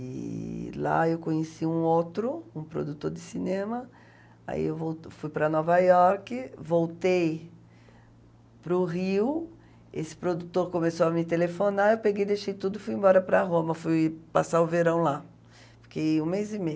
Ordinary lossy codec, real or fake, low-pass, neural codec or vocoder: none; real; none; none